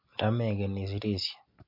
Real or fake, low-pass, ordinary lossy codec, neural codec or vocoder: real; 5.4 kHz; MP3, 32 kbps; none